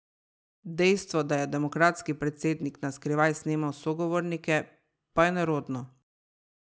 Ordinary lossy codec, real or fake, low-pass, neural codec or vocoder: none; real; none; none